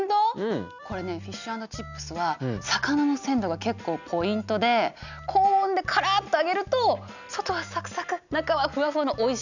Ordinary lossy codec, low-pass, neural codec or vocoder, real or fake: none; 7.2 kHz; none; real